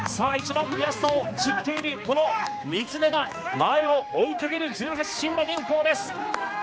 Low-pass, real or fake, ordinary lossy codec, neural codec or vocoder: none; fake; none; codec, 16 kHz, 2 kbps, X-Codec, HuBERT features, trained on general audio